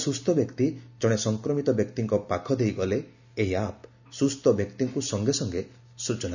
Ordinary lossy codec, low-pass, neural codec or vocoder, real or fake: none; 7.2 kHz; none; real